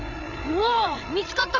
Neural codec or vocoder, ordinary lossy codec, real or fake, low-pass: codec, 16 kHz, 16 kbps, FreqCodec, larger model; none; fake; 7.2 kHz